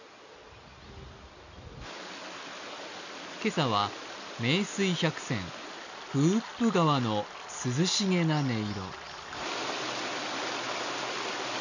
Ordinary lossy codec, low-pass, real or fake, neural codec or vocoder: none; 7.2 kHz; real; none